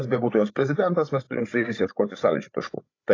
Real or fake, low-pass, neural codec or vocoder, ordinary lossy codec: fake; 7.2 kHz; codec, 16 kHz, 16 kbps, FreqCodec, larger model; AAC, 32 kbps